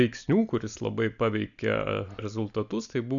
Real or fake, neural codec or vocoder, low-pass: real; none; 7.2 kHz